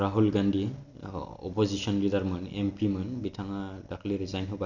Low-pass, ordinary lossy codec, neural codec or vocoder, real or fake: 7.2 kHz; none; none; real